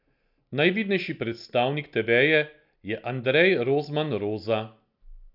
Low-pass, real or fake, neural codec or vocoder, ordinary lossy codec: 5.4 kHz; real; none; none